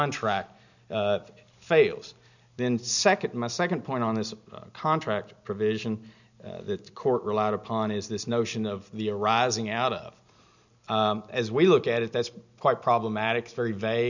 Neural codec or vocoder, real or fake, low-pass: none; real; 7.2 kHz